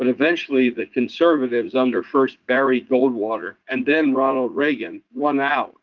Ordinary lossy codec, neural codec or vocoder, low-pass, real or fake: Opus, 32 kbps; vocoder, 44.1 kHz, 80 mel bands, Vocos; 7.2 kHz; fake